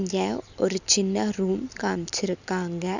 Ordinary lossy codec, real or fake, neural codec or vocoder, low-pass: none; real; none; 7.2 kHz